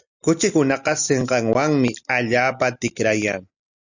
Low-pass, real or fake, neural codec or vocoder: 7.2 kHz; real; none